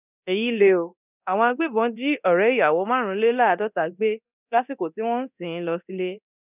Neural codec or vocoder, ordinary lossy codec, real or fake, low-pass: codec, 24 kHz, 0.9 kbps, DualCodec; none; fake; 3.6 kHz